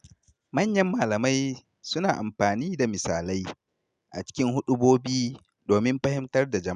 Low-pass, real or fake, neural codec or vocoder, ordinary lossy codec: 10.8 kHz; real; none; none